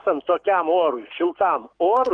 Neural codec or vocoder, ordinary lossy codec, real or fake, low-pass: codec, 44.1 kHz, 7.8 kbps, Pupu-Codec; Opus, 24 kbps; fake; 9.9 kHz